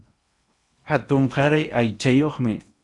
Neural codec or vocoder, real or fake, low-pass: codec, 16 kHz in and 24 kHz out, 0.8 kbps, FocalCodec, streaming, 65536 codes; fake; 10.8 kHz